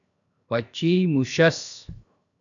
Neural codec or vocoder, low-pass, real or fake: codec, 16 kHz, 0.7 kbps, FocalCodec; 7.2 kHz; fake